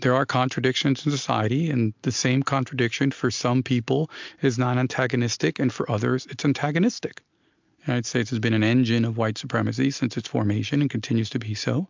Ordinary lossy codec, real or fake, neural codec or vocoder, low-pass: MP3, 64 kbps; real; none; 7.2 kHz